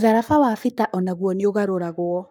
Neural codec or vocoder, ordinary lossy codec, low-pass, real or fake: codec, 44.1 kHz, 7.8 kbps, Pupu-Codec; none; none; fake